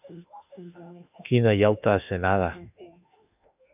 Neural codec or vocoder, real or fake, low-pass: autoencoder, 48 kHz, 32 numbers a frame, DAC-VAE, trained on Japanese speech; fake; 3.6 kHz